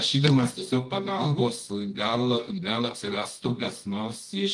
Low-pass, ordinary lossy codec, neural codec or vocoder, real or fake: 10.8 kHz; Opus, 24 kbps; codec, 24 kHz, 0.9 kbps, WavTokenizer, medium music audio release; fake